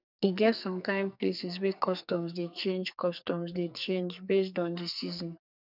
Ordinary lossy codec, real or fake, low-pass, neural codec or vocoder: none; fake; 5.4 kHz; codec, 44.1 kHz, 2.6 kbps, SNAC